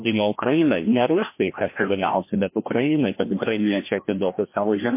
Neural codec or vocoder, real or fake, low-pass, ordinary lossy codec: codec, 16 kHz, 1 kbps, FreqCodec, larger model; fake; 3.6 kHz; MP3, 24 kbps